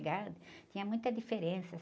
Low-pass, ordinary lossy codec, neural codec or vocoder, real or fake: none; none; none; real